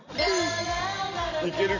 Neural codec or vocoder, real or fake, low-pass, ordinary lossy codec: none; real; 7.2 kHz; none